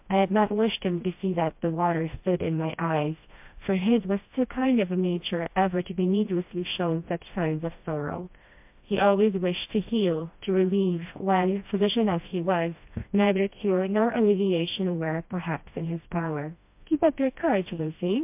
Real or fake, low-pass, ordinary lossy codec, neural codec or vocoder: fake; 3.6 kHz; MP3, 32 kbps; codec, 16 kHz, 1 kbps, FreqCodec, smaller model